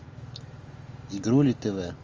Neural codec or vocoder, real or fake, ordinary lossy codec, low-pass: none; real; Opus, 32 kbps; 7.2 kHz